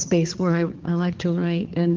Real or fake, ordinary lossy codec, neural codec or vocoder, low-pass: fake; Opus, 16 kbps; codec, 16 kHz, 4 kbps, X-Codec, HuBERT features, trained on balanced general audio; 7.2 kHz